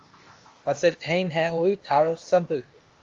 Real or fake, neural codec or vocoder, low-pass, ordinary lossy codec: fake; codec, 16 kHz, 0.8 kbps, ZipCodec; 7.2 kHz; Opus, 32 kbps